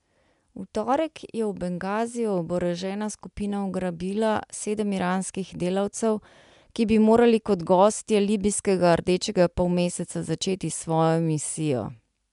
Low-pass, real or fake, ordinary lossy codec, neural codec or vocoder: 10.8 kHz; real; MP3, 96 kbps; none